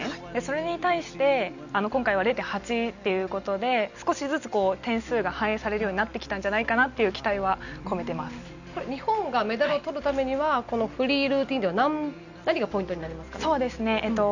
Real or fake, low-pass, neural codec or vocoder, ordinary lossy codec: real; 7.2 kHz; none; none